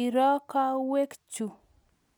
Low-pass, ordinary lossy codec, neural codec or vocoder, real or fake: none; none; none; real